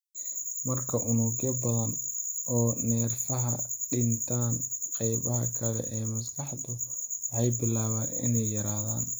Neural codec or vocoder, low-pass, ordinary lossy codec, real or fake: none; none; none; real